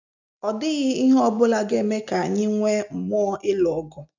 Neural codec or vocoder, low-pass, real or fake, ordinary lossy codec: none; 7.2 kHz; real; none